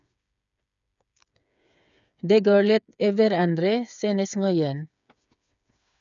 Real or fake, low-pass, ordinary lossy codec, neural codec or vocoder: fake; 7.2 kHz; none; codec, 16 kHz, 8 kbps, FreqCodec, smaller model